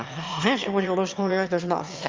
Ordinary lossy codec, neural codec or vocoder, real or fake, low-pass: Opus, 32 kbps; autoencoder, 22.05 kHz, a latent of 192 numbers a frame, VITS, trained on one speaker; fake; 7.2 kHz